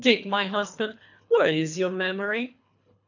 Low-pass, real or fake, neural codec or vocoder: 7.2 kHz; fake; codec, 24 kHz, 3 kbps, HILCodec